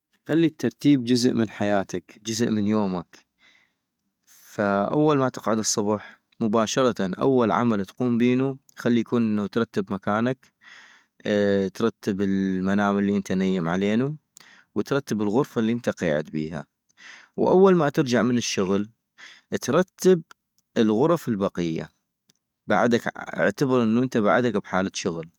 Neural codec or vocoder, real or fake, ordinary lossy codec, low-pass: codec, 44.1 kHz, 7.8 kbps, DAC; fake; MP3, 96 kbps; 19.8 kHz